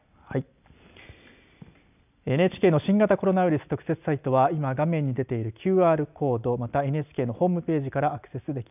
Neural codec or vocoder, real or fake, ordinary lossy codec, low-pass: none; real; none; 3.6 kHz